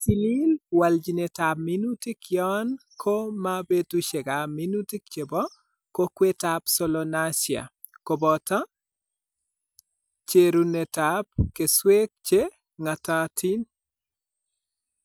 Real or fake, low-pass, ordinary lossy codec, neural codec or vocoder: real; none; none; none